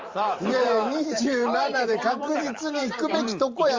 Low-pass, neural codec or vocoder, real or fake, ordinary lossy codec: 7.2 kHz; vocoder, 44.1 kHz, 128 mel bands every 512 samples, BigVGAN v2; fake; Opus, 32 kbps